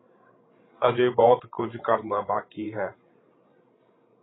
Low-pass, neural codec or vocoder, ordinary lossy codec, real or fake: 7.2 kHz; codec, 16 kHz, 16 kbps, FreqCodec, larger model; AAC, 16 kbps; fake